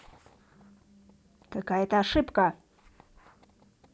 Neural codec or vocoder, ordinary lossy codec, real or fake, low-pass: none; none; real; none